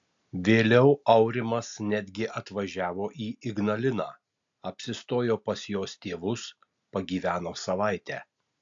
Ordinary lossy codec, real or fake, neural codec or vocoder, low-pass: AAC, 64 kbps; real; none; 7.2 kHz